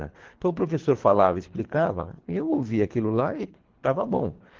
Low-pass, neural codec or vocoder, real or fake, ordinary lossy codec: 7.2 kHz; codec, 24 kHz, 3 kbps, HILCodec; fake; Opus, 16 kbps